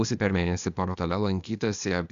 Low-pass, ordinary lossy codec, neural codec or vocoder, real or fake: 7.2 kHz; Opus, 64 kbps; codec, 16 kHz, 0.8 kbps, ZipCodec; fake